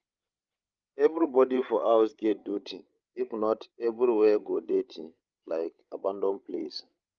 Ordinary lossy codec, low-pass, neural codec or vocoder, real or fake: Opus, 24 kbps; 7.2 kHz; codec, 16 kHz, 16 kbps, FreqCodec, larger model; fake